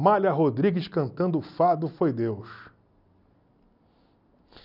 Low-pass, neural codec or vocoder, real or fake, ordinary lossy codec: 5.4 kHz; none; real; none